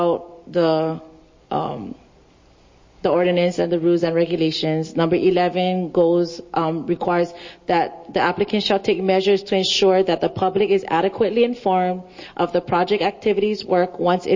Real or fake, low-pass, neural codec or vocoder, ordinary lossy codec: real; 7.2 kHz; none; MP3, 32 kbps